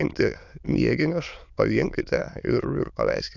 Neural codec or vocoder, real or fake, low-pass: autoencoder, 22.05 kHz, a latent of 192 numbers a frame, VITS, trained on many speakers; fake; 7.2 kHz